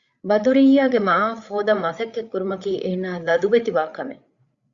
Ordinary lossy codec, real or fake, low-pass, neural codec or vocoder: Opus, 64 kbps; fake; 7.2 kHz; codec, 16 kHz, 8 kbps, FreqCodec, larger model